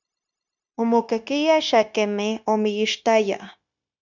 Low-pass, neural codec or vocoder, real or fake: 7.2 kHz; codec, 16 kHz, 0.9 kbps, LongCat-Audio-Codec; fake